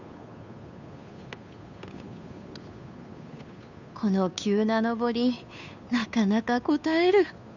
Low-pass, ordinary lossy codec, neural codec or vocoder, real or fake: 7.2 kHz; none; codec, 16 kHz, 2 kbps, FunCodec, trained on Chinese and English, 25 frames a second; fake